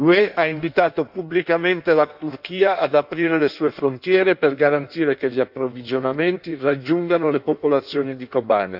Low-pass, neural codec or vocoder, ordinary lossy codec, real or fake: 5.4 kHz; codec, 16 kHz in and 24 kHz out, 1.1 kbps, FireRedTTS-2 codec; none; fake